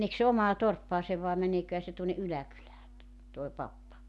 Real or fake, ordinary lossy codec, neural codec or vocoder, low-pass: real; none; none; none